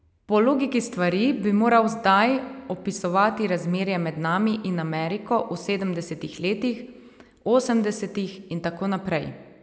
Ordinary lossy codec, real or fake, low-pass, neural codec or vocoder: none; real; none; none